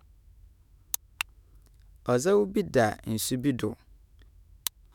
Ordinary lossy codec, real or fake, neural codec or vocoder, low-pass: none; fake; autoencoder, 48 kHz, 128 numbers a frame, DAC-VAE, trained on Japanese speech; 19.8 kHz